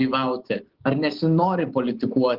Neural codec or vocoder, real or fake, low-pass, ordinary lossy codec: none; real; 5.4 kHz; Opus, 16 kbps